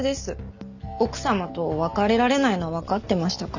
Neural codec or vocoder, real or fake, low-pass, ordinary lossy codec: none; real; 7.2 kHz; none